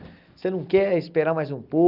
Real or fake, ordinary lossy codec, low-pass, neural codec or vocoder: real; Opus, 24 kbps; 5.4 kHz; none